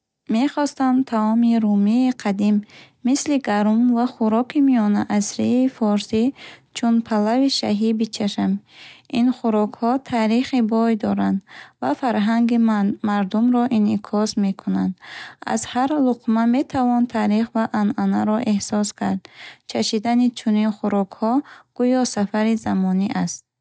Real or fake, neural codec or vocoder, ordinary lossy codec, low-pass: real; none; none; none